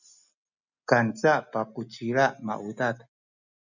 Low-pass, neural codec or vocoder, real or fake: 7.2 kHz; none; real